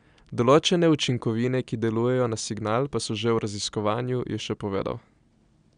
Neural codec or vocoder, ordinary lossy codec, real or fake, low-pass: none; none; real; 9.9 kHz